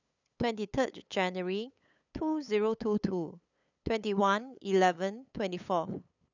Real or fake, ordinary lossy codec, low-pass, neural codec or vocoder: fake; none; 7.2 kHz; codec, 16 kHz, 8 kbps, FunCodec, trained on LibriTTS, 25 frames a second